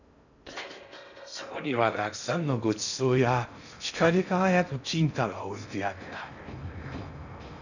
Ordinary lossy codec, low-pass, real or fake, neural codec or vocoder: none; 7.2 kHz; fake; codec, 16 kHz in and 24 kHz out, 0.6 kbps, FocalCodec, streaming, 2048 codes